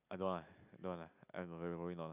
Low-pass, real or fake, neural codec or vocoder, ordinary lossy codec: 3.6 kHz; real; none; none